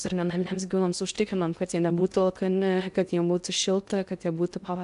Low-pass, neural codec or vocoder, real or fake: 10.8 kHz; codec, 16 kHz in and 24 kHz out, 0.6 kbps, FocalCodec, streaming, 2048 codes; fake